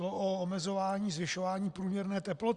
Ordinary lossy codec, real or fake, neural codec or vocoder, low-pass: Opus, 64 kbps; real; none; 10.8 kHz